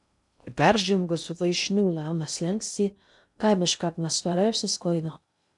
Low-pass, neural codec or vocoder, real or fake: 10.8 kHz; codec, 16 kHz in and 24 kHz out, 0.6 kbps, FocalCodec, streaming, 2048 codes; fake